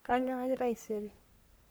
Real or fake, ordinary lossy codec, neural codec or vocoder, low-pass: fake; none; codec, 44.1 kHz, 3.4 kbps, Pupu-Codec; none